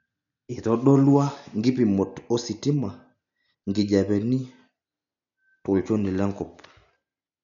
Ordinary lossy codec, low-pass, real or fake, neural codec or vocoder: none; 7.2 kHz; real; none